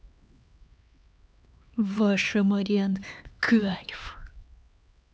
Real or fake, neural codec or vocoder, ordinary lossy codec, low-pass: fake; codec, 16 kHz, 2 kbps, X-Codec, HuBERT features, trained on LibriSpeech; none; none